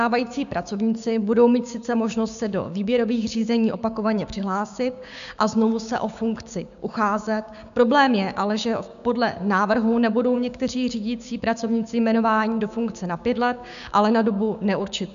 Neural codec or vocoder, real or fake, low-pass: codec, 16 kHz, 6 kbps, DAC; fake; 7.2 kHz